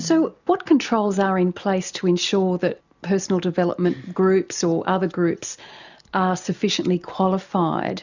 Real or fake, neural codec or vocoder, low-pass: real; none; 7.2 kHz